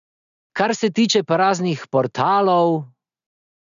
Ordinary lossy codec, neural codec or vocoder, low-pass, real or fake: none; none; 7.2 kHz; real